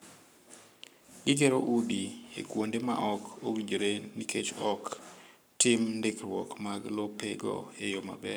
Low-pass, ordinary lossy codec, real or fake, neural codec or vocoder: none; none; fake; codec, 44.1 kHz, 7.8 kbps, Pupu-Codec